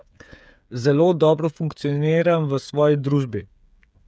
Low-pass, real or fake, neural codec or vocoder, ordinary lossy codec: none; fake; codec, 16 kHz, 8 kbps, FreqCodec, smaller model; none